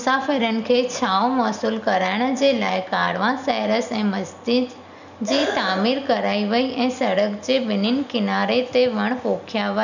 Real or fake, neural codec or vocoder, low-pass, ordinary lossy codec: real; none; 7.2 kHz; none